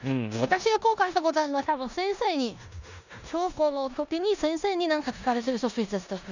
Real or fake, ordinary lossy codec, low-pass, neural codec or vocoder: fake; none; 7.2 kHz; codec, 16 kHz in and 24 kHz out, 0.9 kbps, LongCat-Audio-Codec, four codebook decoder